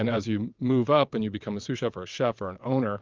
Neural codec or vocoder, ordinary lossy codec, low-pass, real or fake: vocoder, 22.05 kHz, 80 mel bands, WaveNeXt; Opus, 24 kbps; 7.2 kHz; fake